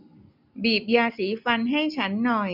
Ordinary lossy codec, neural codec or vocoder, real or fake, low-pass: none; none; real; 5.4 kHz